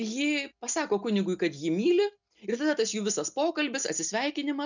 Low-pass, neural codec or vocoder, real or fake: 7.2 kHz; none; real